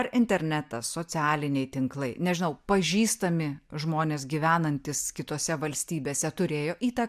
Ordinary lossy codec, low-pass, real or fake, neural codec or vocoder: MP3, 96 kbps; 14.4 kHz; real; none